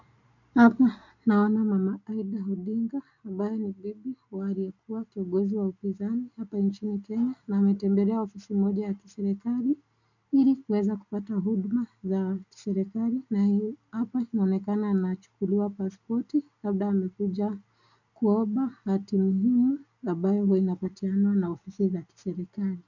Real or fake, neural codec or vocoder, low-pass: real; none; 7.2 kHz